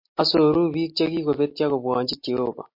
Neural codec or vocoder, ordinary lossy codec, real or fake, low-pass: none; MP3, 32 kbps; real; 5.4 kHz